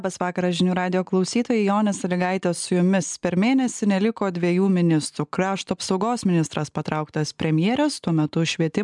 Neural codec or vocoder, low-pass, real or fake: none; 10.8 kHz; real